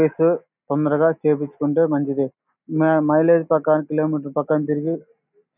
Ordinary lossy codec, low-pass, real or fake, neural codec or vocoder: none; 3.6 kHz; real; none